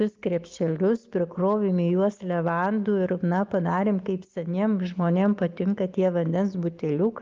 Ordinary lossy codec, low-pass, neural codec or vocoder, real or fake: Opus, 32 kbps; 7.2 kHz; none; real